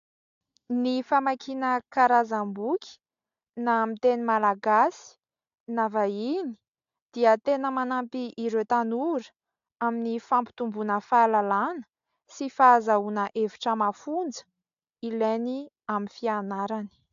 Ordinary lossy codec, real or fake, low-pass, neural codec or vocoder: AAC, 64 kbps; real; 7.2 kHz; none